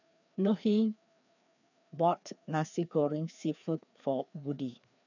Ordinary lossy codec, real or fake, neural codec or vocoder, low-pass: none; fake; codec, 16 kHz, 2 kbps, FreqCodec, larger model; 7.2 kHz